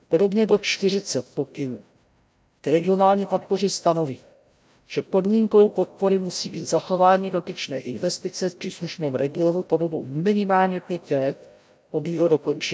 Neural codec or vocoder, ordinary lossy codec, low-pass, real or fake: codec, 16 kHz, 0.5 kbps, FreqCodec, larger model; none; none; fake